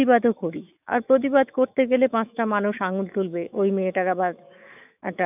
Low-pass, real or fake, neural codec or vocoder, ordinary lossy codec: 3.6 kHz; fake; autoencoder, 48 kHz, 128 numbers a frame, DAC-VAE, trained on Japanese speech; none